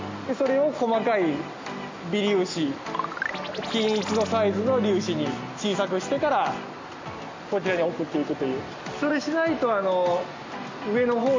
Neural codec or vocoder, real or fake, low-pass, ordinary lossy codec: none; real; 7.2 kHz; MP3, 48 kbps